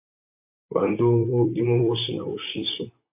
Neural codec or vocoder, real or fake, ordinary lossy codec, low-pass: vocoder, 44.1 kHz, 128 mel bands, Pupu-Vocoder; fake; MP3, 24 kbps; 3.6 kHz